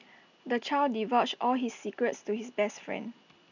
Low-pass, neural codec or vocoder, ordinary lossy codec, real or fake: 7.2 kHz; none; none; real